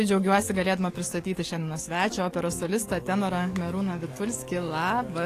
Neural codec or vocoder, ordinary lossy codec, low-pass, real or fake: codec, 44.1 kHz, 7.8 kbps, DAC; AAC, 48 kbps; 14.4 kHz; fake